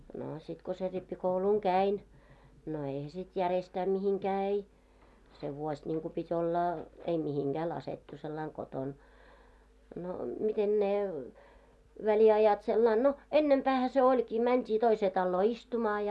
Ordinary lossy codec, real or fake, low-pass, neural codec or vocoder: none; real; none; none